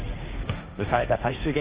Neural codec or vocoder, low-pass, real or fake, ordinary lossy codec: codec, 16 kHz, 1.1 kbps, Voila-Tokenizer; 3.6 kHz; fake; Opus, 24 kbps